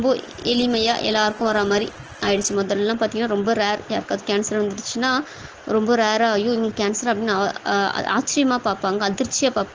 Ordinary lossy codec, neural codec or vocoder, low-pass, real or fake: Opus, 16 kbps; none; 7.2 kHz; real